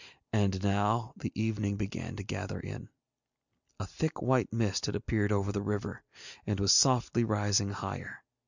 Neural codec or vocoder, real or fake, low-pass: none; real; 7.2 kHz